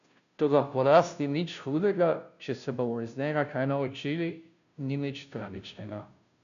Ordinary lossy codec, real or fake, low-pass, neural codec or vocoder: none; fake; 7.2 kHz; codec, 16 kHz, 0.5 kbps, FunCodec, trained on Chinese and English, 25 frames a second